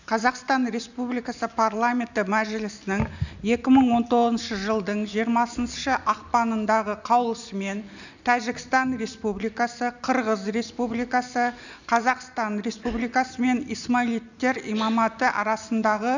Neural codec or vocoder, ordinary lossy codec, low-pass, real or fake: none; none; 7.2 kHz; real